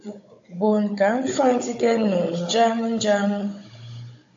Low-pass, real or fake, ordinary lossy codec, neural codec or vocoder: 7.2 kHz; fake; AAC, 48 kbps; codec, 16 kHz, 16 kbps, FunCodec, trained on Chinese and English, 50 frames a second